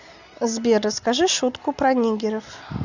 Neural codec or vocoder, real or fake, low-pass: none; real; 7.2 kHz